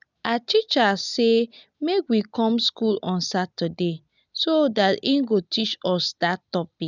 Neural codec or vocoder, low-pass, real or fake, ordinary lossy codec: none; 7.2 kHz; real; none